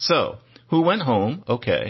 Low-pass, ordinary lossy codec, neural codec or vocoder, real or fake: 7.2 kHz; MP3, 24 kbps; none; real